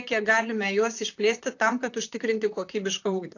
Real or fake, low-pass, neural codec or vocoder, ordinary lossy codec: fake; 7.2 kHz; vocoder, 44.1 kHz, 128 mel bands, Pupu-Vocoder; AAC, 48 kbps